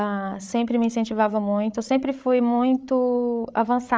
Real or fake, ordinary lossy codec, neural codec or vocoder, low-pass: fake; none; codec, 16 kHz, 8 kbps, FreqCodec, larger model; none